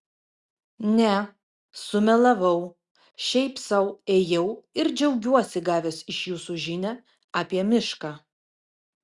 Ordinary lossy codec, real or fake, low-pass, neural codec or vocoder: Opus, 64 kbps; real; 10.8 kHz; none